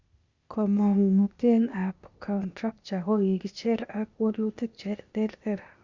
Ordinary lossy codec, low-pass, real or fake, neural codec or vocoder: Opus, 64 kbps; 7.2 kHz; fake; codec, 16 kHz, 0.8 kbps, ZipCodec